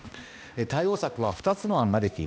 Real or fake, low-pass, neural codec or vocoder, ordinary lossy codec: fake; none; codec, 16 kHz, 1 kbps, X-Codec, HuBERT features, trained on balanced general audio; none